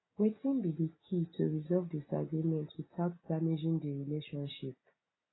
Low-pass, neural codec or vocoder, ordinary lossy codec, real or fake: 7.2 kHz; none; AAC, 16 kbps; real